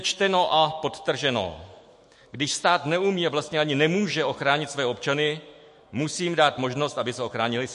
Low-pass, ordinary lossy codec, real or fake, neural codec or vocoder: 14.4 kHz; MP3, 48 kbps; fake; autoencoder, 48 kHz, 128 numbers a frame, DAC-VAE, trained on Japanese speech